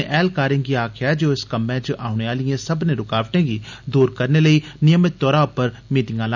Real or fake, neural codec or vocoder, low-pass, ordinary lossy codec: real; none; 7.2 kHz; none